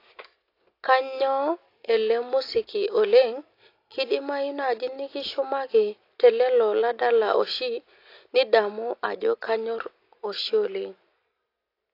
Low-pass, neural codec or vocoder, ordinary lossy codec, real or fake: 5.4 kHz; none; AAC, 32 kbps; real